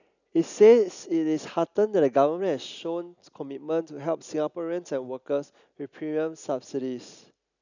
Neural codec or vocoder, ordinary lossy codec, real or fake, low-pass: none; none; real; 7.2 kHz